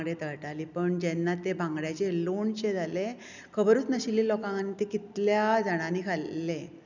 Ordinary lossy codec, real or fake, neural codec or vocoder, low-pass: none; real; none; 7.2 kHz